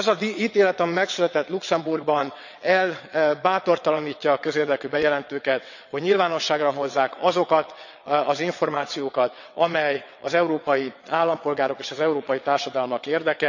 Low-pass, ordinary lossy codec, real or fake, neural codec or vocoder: 7.2 kHz; none; fake; vocoder, 22.05 kHz, 80 mel bands, WaveNeXt